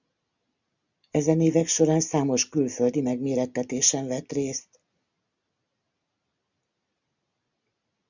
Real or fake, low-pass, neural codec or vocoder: real; 7.2 kHz; none